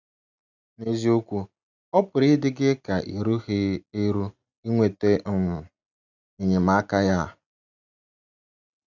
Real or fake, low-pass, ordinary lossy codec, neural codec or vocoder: real; 7.2 kHz; none; none